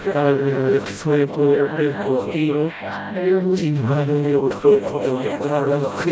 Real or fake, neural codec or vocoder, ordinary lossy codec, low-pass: fake; codec, 16 kHz, 0.5 kbps, FreqCodec, smaller model; none; none